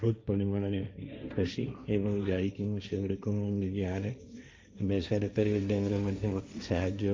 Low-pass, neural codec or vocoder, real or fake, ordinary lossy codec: none; codec, 16 kHz, 1.1 kbps, Voila-Tokenizer; fake; none